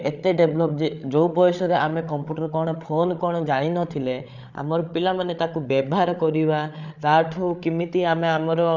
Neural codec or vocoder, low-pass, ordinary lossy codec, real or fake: codec, 16 kHz, 8 kbps, FreqCodec, larger model; 7.2 kHz; none; fake